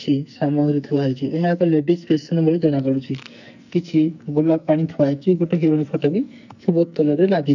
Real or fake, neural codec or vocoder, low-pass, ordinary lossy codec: fake; codec, 44.1 kHz, 2.6 kbps, SNAC; 7.2 kHz; none